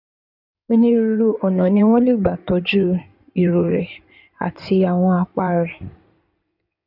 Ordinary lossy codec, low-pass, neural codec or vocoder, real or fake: none; 5.4 kHz; codec, 16 kHz in and 24 kHz out, 2.2 kbps, FireRedTTS-2 codec; fake